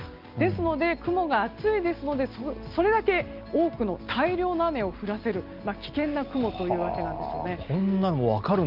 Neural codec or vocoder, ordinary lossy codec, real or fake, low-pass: none; Opus, 24 kbps; real; 5.4 kHz